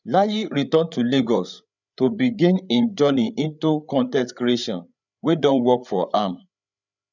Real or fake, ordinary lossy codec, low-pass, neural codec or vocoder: fake; none; 7.2 kHz; codec, 16 kHz, 8 kbps, FreqCodec, larger model